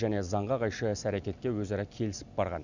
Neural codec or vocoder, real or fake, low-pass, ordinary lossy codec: none; real; 7.2 kHz; none